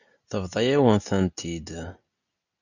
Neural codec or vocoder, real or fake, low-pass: none; real; 7.2 kHz